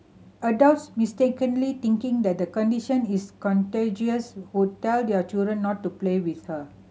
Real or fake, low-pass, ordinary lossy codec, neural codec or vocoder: real; none; none; none